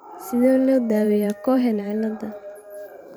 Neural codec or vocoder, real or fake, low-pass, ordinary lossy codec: vocoder, 44.1 kHz, 128 mel bands every 512 samples, BigVGAN v2; fake; none; none